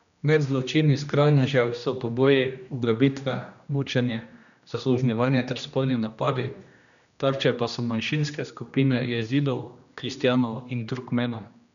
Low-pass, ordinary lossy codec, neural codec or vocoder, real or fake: 7.2 kHz; none; codec, 16 kHz, 1 kbps, X-Codec, HuBERT features, trained on general audio; fake